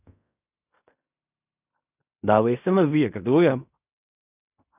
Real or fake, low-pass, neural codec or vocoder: fake; 3.6 kHz; codec, 16 kHz in and 24 kHz out, 0.4 kbps, LongCat-Audio-Codec, fine tuned four codebook decoder